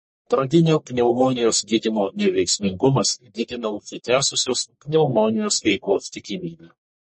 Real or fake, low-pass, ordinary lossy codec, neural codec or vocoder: fake; 10.8 kHz; MP3, 32 kbps; codec, 44.1 kHz, 1.7 kbps, Pupu-Codec